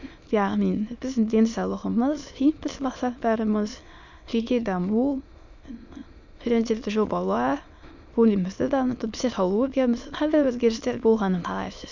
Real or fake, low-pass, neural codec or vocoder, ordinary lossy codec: fake; 7.2 kHz; autoencoder, 22.05 kHz, a latent of 192 numbers a frame, VITS, trained on many speakers; none